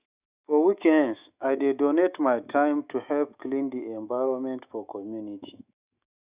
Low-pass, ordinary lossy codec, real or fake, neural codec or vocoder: 3.6 kHz; Opus, 64 kbps; fake; autoencoder, 48 kHz, 128 numbers a frame, DAC-VAE, trained on Japanese speech